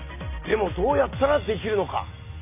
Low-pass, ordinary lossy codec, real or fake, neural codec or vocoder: 3.6 kHz; none; real; none